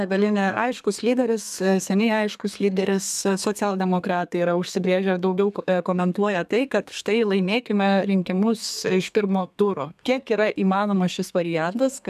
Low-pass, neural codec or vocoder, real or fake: 14.4 kHz; codec, 32 kHz, 1.9 kbps, SNAC; fake